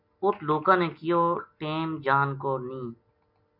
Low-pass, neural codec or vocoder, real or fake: 5.4 kHz; none; real